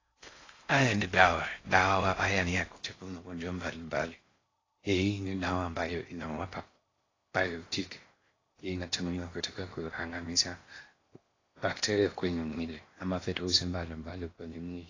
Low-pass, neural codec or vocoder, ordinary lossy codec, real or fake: 7.2 kHz; codec, 16 kHz in and 24 kHz out, 0.6 kbps, FocalCodec, streaming, 4096 codes; AAC, 32 kbps; fake